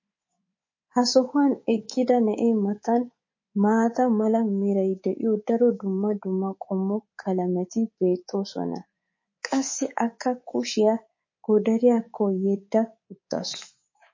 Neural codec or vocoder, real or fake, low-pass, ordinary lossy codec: codec, 24 kHz, 3.1 kbps, DualCodec; fake; 7.2 kHz; MP3, 32 kbps